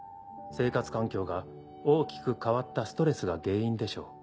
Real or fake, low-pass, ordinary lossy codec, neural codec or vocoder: real; none; none; none